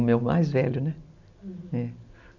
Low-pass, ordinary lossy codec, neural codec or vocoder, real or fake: 7.2 kHz; none; none; real